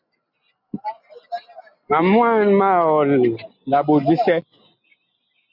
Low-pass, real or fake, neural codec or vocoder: 5.4 kHz; real; none